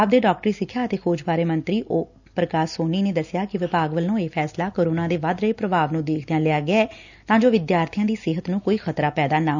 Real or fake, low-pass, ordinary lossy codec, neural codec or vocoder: real; 7.2 kHz; none; none